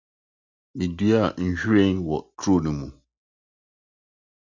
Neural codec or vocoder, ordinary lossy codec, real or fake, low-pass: none; Opus, 64 kbps; real; 7.2 kHz